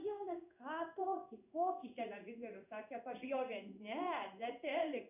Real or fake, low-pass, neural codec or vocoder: fake; 3.6 kHz; codec, 16 kHz in and 24 kHz out, 1 kbps, XY-Tokenizer